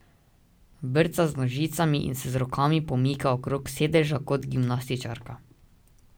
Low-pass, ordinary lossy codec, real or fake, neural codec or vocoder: none; none; real; none